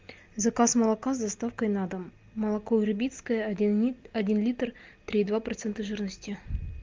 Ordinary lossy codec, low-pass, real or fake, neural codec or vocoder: Opus, 32 kbps; 7.2 kHz; real; none